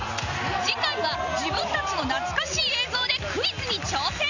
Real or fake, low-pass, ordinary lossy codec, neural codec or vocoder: real; 7.2 kHz; none; none